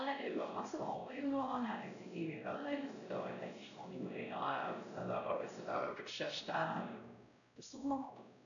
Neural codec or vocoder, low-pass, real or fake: codec, 16 kHz, 0.5 kbps, X-Codec, WavLM features, trained on Multilingual LibriSpeech; 7.2 kHz; fake